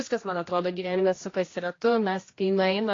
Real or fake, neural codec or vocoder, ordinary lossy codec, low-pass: fake; codec, 16 kHz, 1 kbps, X-Codec, HuBERT features, trained on general audio; AAC, 32 kbps; 7.2 kHz